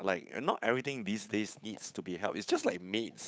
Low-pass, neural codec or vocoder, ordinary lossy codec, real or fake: none; codec, 16 kHz, 8 kbps, FunCodec, trained on Chinese and English, 25 frames a second; none; fake